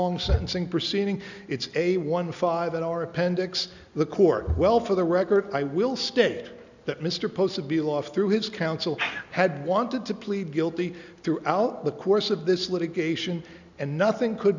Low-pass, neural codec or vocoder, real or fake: 7.2 kHz; none; real